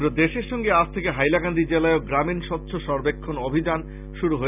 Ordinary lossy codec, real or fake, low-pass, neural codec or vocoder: none; real; 3.6 kHz; none